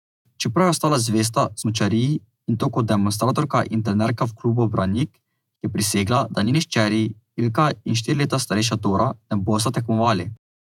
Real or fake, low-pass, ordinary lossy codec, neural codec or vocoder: fake; 19.8 kHz; none; vocoder, 48 kHz, 128 mel bands, Vocos